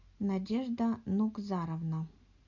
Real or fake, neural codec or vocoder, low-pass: real; none; 7.2 kHz